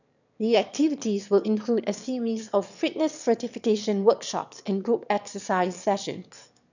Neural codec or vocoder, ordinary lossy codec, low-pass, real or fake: autoencoder, 22.05 kHz, a latent of 192 numbers a frame, VITS, trained on one speaker; none; 7.2 kHz; fake